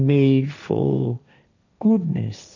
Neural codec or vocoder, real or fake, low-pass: codec, 16 kHz, 1.1 kbps, Voila-Tokenizer; fake; 7.2 kHz